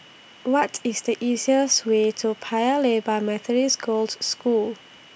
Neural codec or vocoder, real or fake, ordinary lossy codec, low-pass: none; real; none; none